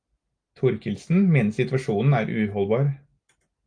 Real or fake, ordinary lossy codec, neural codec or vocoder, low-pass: real; Opus, 32 kbps; none; 9.9 kHz